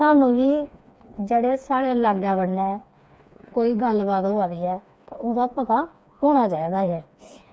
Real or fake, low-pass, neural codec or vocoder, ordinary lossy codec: fake; none; codec, 16 kHz, 4 kbps, FreqCodec, smaller model; none